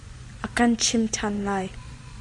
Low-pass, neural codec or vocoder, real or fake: 10.8 kHz; vocoder, 24 kHz, 100 mel bands, Vocos; fake